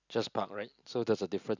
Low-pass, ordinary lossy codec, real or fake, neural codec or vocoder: 7.2 kHz; none; real; none